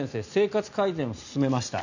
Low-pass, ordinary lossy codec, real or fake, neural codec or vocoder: 7.2 kHz; none; real; none